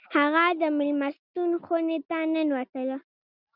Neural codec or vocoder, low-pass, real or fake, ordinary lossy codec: none; 5.4 kHz; real; Opus, 64 kbps